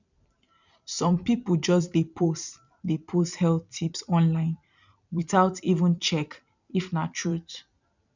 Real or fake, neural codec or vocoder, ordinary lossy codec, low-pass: fake; vocoder, 44.1 kHz, 128 mel bands every 256 samples, BigVGAN v2; none; 7.2 kHz